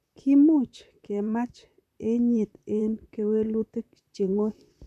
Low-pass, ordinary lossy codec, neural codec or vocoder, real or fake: 14.4 kHz; none; vocoder, 44.1 kHz, 128 mel bands, Pupu-Vocoder; fake